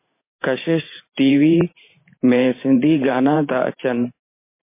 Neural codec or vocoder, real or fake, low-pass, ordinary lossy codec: vocoder, 44.1 kHz, 128 mel bands every 512 samples, BigVGAN v2; fake; 3.6 kHz; MP3, 24 kbps